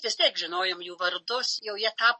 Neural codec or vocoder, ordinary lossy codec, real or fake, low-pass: none; MP3, 32 kbps; real; 10.8 kHz